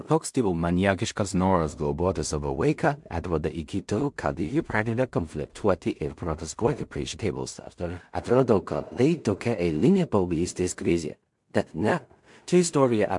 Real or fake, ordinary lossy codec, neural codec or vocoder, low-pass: fake; MP3, 64 kbps; codec, 16 kHz in and 24 kHz out, 0.4 kbps, LongCat-Audio-Codec, two codebook decoder; 10.8 kHz